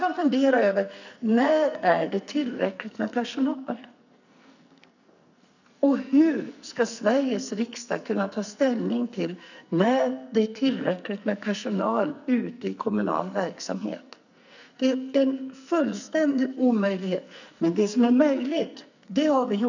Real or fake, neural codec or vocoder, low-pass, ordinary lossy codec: fake; codec, 44.1 kHz, 2.6 kbps, SNAC; 7.2 kHz; none